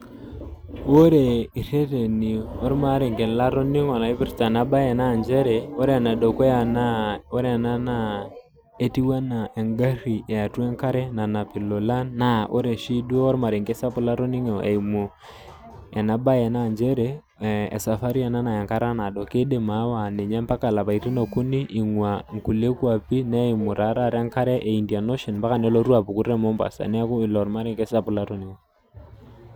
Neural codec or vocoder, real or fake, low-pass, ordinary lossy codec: none; real; none; none